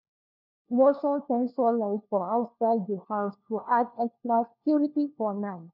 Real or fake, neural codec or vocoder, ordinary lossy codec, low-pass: fake; codec, 16 kHz, 1 kbps, FunCodec, trained on LibriTTS, 50 frames a second; none; 5.4 kHz